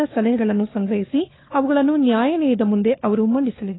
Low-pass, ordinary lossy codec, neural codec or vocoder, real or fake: 7.2 kHz; AAC, 16 kbps; codec, 16 kHz, 4 kbps, FunCodec, trained on LibriTTS, 50 frames a second; fake